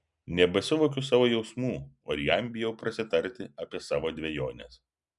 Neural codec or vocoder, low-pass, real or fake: none; 10.8 kHz; real